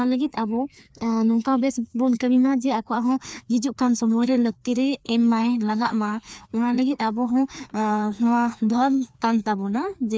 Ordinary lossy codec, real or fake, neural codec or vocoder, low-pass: none; fake; codec, 16 kHz, 2 kbps, FreqCodec, larger model; none